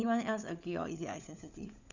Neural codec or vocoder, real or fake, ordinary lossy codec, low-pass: codec, 16 kHz, 16 kbps, FunCodec, trained on LibriTTS, 50 frames a second; fake; none; 7.2 kHz